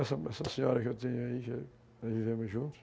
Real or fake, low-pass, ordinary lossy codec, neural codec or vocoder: real; none; none; none